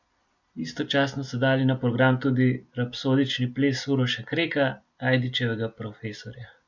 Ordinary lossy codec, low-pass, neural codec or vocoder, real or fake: none; 7.2 kHz; none; real